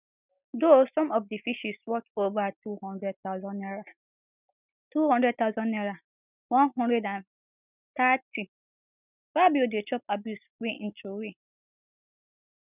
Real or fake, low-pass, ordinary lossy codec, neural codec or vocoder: real; 3.6 kHz; none; none